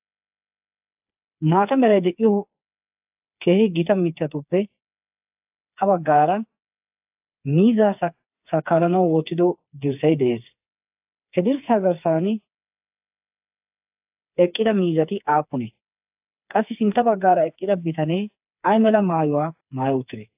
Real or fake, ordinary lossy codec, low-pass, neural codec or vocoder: fake; AAC, 32 kbps; 3.6 kHz; codec, 16 kHz, 4 kbps, FreqCodec, smaller model